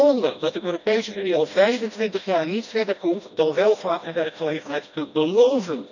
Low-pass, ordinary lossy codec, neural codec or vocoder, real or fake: 7.2 kHz; none; codec, 16 kHz, 1 kbps, FreqCodec, smaller model; fake